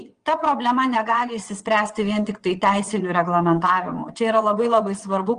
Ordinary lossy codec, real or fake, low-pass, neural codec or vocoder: Opus, 24 kbps; fake; 9.9 kHz; vocoder, 22.05 kHz, 80 mel bands, WaveNeXt